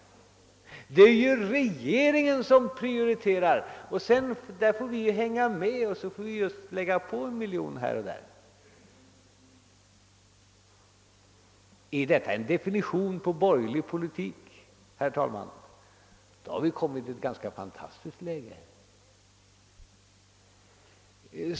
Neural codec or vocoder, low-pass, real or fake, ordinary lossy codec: none; none; real; none